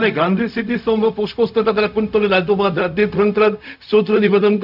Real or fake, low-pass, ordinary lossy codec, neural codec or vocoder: fake; 5.4 kHz; none; codec, 16 kHz, 0.4 kbps, LongCat-Audio-Codec